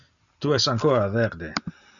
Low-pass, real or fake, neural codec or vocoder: 7.2 kHz; real; none